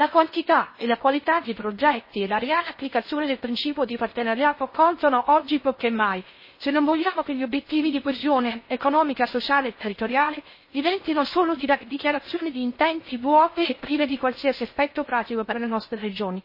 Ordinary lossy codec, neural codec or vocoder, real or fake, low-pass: MP3, 24 kbps; codec, 16 kHz in and 24 kHz out, 0.6 kbps, FocalCodec, streaming, 4096 codes; fake; 5.4 kHz